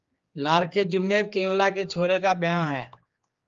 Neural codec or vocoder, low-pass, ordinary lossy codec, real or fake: codec, 16 kHz, 2 kbps, X-Codec, HuBERT features, trained on general audio; 7.2 kHz; Opus, 32 kbps; fake